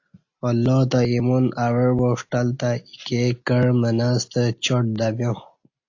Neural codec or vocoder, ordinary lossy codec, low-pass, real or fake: none; AAC, 48 kbps; 7.2 kHz; real